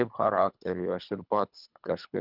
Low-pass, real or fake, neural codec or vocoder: 5.4 kHz; fake; codec, 24 kHz, 3 kbps, HILCodec